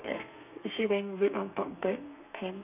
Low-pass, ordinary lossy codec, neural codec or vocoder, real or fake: 3.6 kHz; none; codec, 32 kHz, 1.9 kbps, SNAC; fake